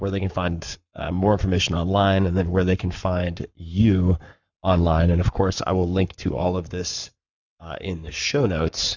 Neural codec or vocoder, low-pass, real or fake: codec, 44.1 kHz, 7.8 kbps, Pupu-Codec; 7.2 kHz; fake